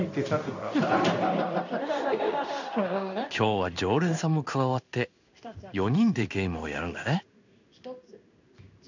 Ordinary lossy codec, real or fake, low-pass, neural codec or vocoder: none; fake; 7.2 kHz; codec, 16 kHz in and 24 kHz out, 1 kbps, XY-Tokenizer